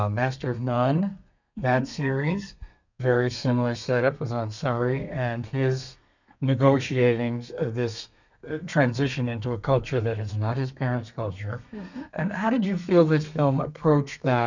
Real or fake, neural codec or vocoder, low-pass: fake; codec, 32 kHz, 1.9 kbps, SNAC; 7.2 kHz